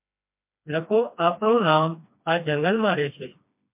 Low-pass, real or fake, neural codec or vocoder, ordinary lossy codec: 3.6 kHz; fake; codec, 16 kHz, 2 kbps, FreqCodec, smaller model; MP3, 32 kbps